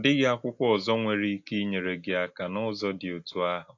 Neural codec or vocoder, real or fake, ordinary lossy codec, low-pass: none; real; AAC, 48 kbps; 7.2 kHz